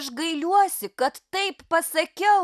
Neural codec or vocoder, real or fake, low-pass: none; real; 14.4 kHz